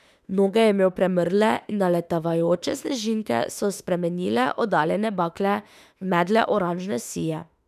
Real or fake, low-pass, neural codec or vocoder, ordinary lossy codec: fake; 14.4 kHz; autoencoder, 48 kHz, 32 numbers a frame, DAC-VAE, trained on Japanese speech; none